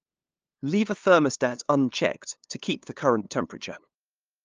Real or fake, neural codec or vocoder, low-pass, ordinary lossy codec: fake; codec, 16 kHz, 2 kbps, FunCodec, trained on LibriTTS, 25 frames a second; 7.2 kHz; Opus, 24 kbps